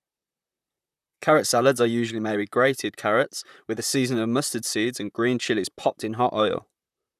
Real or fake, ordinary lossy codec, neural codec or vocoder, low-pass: fake; none; vocoder, 44.1 kHz, 128 mel bands, Pupu-Vocoder; 14.4 kHz